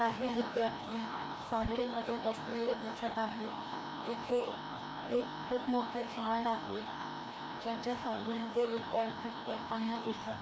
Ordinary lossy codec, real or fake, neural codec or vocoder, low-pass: none; fake; codec, 16 kHz, 1 kbps, FreqCodec, larger model; none